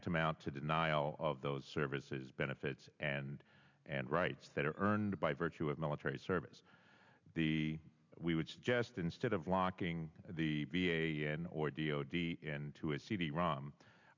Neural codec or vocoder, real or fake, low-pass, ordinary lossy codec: none; real; 7.2 kHz; MP3, 64 kbps